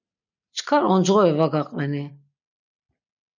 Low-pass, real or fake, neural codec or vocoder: 7.2 kHz; real; none